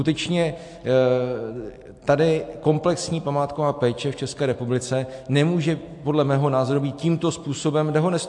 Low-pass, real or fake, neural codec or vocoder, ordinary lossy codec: 10.8 kHz; real; none; AAC, 64 kbps